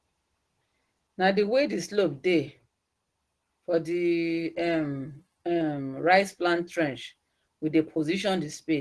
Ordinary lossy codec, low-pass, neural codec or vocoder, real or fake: Opus, 16 kbps; 10.8 kHz; none; real